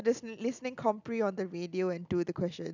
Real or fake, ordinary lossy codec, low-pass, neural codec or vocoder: real; none; 7.2 kHz; none